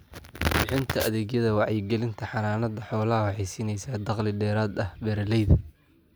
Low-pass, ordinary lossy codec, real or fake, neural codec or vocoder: none; none; real; none